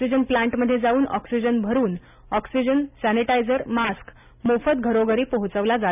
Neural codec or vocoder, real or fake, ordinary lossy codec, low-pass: none; real; none; 3.6 kHz